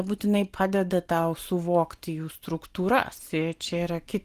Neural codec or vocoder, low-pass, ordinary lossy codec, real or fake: none; 14.4 kHz; Opus, 24 kbps; real